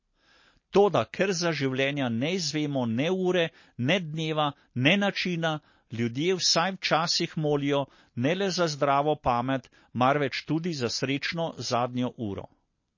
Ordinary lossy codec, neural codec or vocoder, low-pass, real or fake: MP3, 32 kbps; none; 7.2 kHz; real